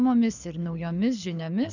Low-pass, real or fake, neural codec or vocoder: 7.2 kHz; fake; codec, 24 kHz, 6 kbps, HILCodec